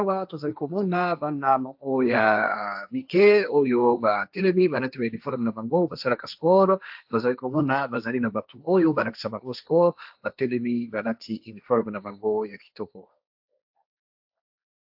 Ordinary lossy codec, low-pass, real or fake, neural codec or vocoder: AAC, 48 kbps; 5.4 kHz; fake; codec, 16 kHz, 1.1 kbps, Voila-Tokenizer